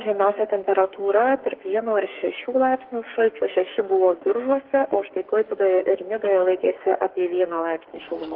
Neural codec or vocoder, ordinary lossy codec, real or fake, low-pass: codec, 44.1 kHz, 2.6 kbps, SNAC; Opus, 16 kbps; fake; 5.4 kHz